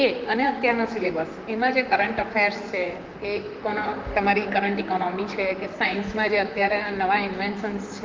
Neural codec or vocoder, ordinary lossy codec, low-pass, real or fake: vocoder, 44.1 kHz, 128 mel bands, Pupu-Vocoder; Opus, 24 kbps; 7.2 kHz; fake